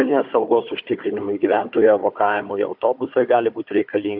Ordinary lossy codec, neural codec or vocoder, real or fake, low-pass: AAC, 48 kbps; codec, 16 kHz, 4 kbps, FunCodec, trained on Chinese and English, 50 frames a second; fake; 5.4 kHz